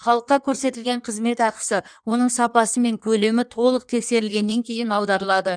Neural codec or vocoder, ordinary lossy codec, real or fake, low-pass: codec, 16 kHz in and 24 kHz out, 1.1 kbps, FireRedTTS-2 codec; none; fake; 9.9 kHz